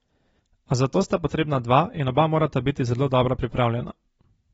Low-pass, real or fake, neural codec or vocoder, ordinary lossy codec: 19.8 kHz; real; none; AAC, 24 kbps